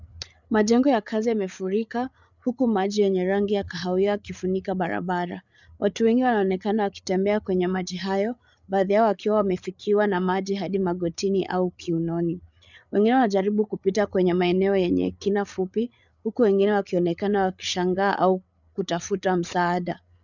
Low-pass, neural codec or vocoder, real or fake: 7.2 kHz; codec, 16 kHz, 8 kbps, FreqCodec, larger model; fake